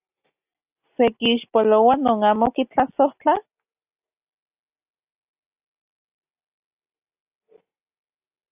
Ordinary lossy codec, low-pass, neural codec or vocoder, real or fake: AAC, 32 kbps; 3.6 kHz; none; real